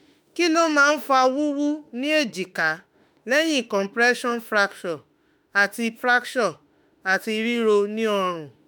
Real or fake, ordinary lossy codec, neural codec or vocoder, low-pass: fake; none; autoencoder, 48 kHz, 32 numbers a frame, DAC-VAE, trained on Japanese speech; none